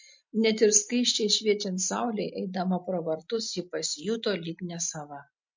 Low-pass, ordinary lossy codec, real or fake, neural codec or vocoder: 7.2 kHz; MP3, 48 kbps; real; none